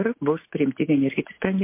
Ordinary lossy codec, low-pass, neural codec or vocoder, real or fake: MP3, 24 kbps; 3.6 kHz; none; real